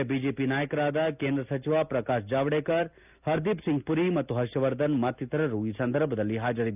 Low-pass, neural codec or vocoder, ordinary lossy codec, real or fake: 3.6 kHz; none; none; real